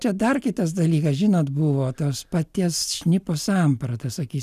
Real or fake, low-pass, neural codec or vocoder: real; 14.4 kHz; none